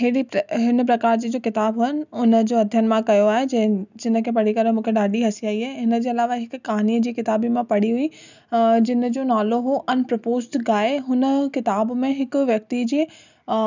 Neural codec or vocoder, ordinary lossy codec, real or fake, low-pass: none; none; real; 7.2 kHz